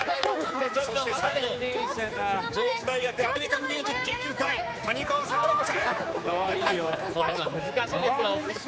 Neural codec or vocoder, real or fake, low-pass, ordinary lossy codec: codec, 16 kHz, 4 kbps, X-Codec, HuBERT features, trained on general audio; fake; none; none